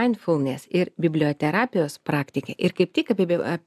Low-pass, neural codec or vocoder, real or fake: 14.4 kHz; none; real